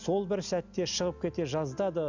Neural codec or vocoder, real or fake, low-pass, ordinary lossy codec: none; real; 7.2 kHz; none